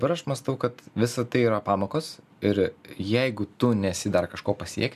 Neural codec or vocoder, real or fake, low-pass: none; real; 14.4 kHz